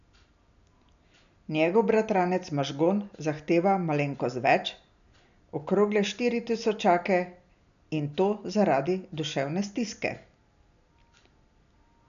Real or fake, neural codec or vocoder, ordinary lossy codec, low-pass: real; none; none; 7.2 kHz